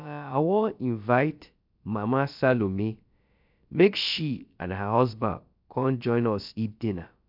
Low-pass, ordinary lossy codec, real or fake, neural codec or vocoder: 5.4 kHz; MP3, 48 kbps; fake; codec, 16 kHz, about 1 kbps, DyCAST, with the encoder's durations